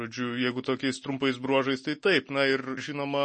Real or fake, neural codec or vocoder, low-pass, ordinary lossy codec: real; none; 10.8 kHz; MP3, 32 kbps